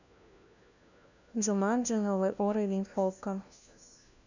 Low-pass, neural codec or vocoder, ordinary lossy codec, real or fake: 7.2 kHz; codec, 16 kHz, 1 kbps, FunCodec, trained on LibriTTS, 50 frames a second; none; fake